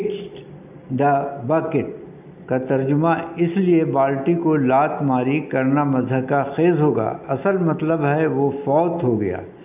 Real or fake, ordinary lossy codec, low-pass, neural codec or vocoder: real; none; 3.6 kHz; none